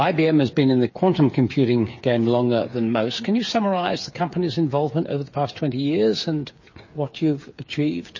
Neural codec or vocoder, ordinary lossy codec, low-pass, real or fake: codec, 16 kHz, 8 kbps, FreqCodec, smaller model; MP3, 32 kbps; 7.2 kHz; fake